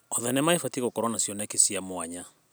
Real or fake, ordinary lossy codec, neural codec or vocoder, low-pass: real; none; none; none